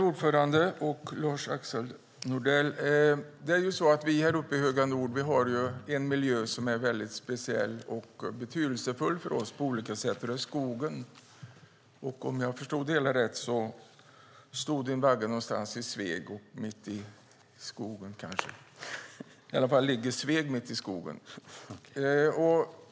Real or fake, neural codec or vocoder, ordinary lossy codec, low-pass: real; none; none; none